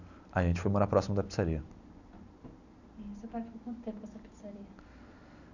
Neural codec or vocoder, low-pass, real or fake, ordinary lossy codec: none; 7.2 kHz; real; none